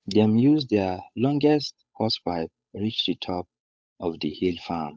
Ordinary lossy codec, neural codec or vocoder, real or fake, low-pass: none; codec, 16 kHz, 8 kbps, FunCodec, trained on Chinese and English, 25 frames a second; fake; none